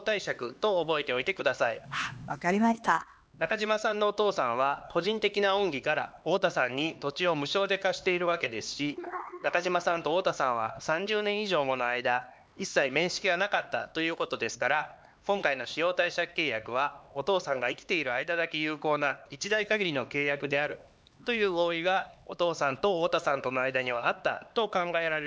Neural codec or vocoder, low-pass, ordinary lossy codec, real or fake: codec, 16 kHz, 2 kbps, X-Codec, HuBERT features, trained on LibriSpeech; none; none; fake